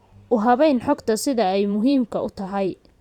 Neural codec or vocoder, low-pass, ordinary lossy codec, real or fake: vocoder, 44.1 kHz, 128 mel bands every 512 samples, BigVGAN v2; 19.8 kHz; none; fake